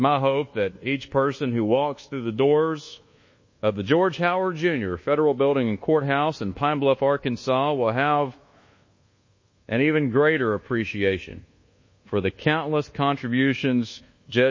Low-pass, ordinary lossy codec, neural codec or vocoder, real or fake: 7.2 kHz; MP3, 32 kbps; codec, 24 kHz, 1.2 kbps, DualCodec; fake